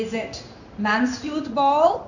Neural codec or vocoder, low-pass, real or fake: codec, 16 kHz in and 24 kHz out, 1 kbps, XY-Tokenizer; 7.2 kHz; fake